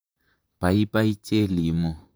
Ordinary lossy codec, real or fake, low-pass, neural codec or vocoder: none; fake; none; vocoder, 44.1 kHz, 128 mel bands, Pupu-Vocoder